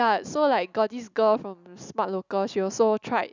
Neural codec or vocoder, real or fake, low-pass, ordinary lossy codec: none; real; 7.2 kHz; none